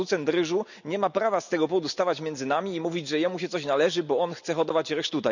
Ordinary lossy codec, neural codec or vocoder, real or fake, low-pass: none; none; real; 7.2 kHz